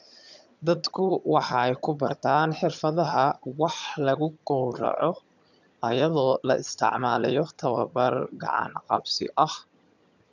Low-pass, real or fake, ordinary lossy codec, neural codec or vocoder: 7.2 kHz; fake; none; vocoder, 22.05 kHz, 80 mel bands, HiFi-GAN